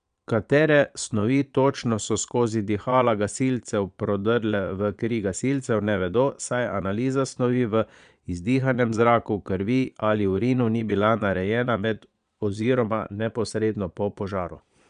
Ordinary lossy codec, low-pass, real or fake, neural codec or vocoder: none; 9.9 kHz; fake; vocoder, 22.05 kHz, 80 mel bands, Vocos